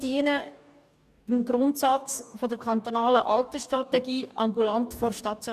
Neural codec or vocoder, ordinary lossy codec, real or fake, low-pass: codec, 44.1 kHz, 2.6 kbps, DAC; none; fake; 14.4 kHz